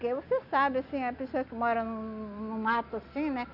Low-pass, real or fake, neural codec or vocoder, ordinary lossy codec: 5.4 kHz; real; none; none